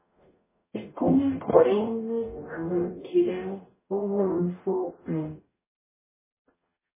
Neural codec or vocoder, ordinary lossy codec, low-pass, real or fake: codec, 44.1 kHz, 0.9 kbps, DAC; MP3, 16 kbps; 3.6 kHz; fake